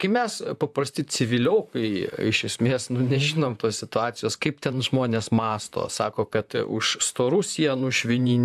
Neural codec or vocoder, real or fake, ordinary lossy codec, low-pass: vocoder, 44.1 kHz, 128 mel bands, Pupu-Vocoder; fake; AAC, 96 kbps; 14.4 kHz